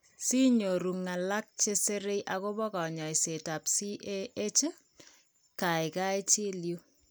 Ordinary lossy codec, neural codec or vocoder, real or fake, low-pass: none; none; real; none